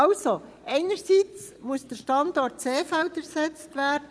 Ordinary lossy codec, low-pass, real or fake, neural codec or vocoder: none; none; fake; vocoder, 22.05 kHz, 80 mel bands, Vocos